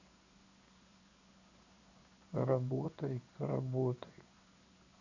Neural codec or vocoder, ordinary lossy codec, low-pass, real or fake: codec, 16 kHz in and 24 kHz out, 1 kbps, XY-Tokenizer; Opus, 64 kbps; 7.2 kHz; fake